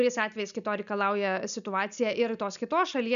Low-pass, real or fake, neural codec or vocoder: 7.2 kHz; real; none